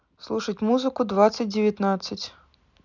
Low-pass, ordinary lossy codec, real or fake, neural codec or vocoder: 7.2 kHz; none; real; none